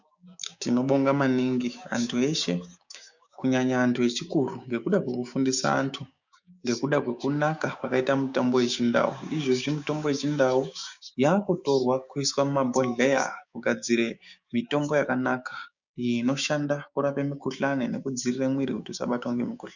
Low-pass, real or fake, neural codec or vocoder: 7.2 kHz; fake; codec, 16 kHz, 6 kbps, DAC